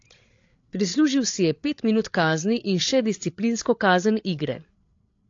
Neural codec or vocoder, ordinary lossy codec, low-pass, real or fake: codec, 16 kHz, 8 kbps, FreqCodec, larger model; AAC, 48 kbps; 7.2 kHz; fake